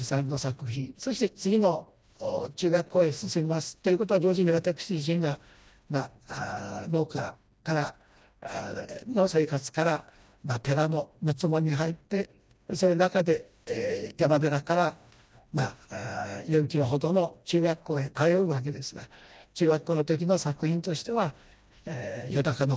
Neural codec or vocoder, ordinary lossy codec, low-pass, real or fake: codec, 16 kHz, 1 kbps, FreqCodec, smaller model; none; none; fake